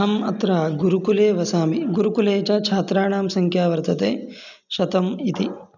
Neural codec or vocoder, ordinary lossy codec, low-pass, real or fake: none; none; 7.2 kHz; real